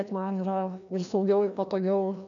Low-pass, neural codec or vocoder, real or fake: 7.2 kHz; codec, 16 kHz, 1 kbps, FreqCodec, larger model; fake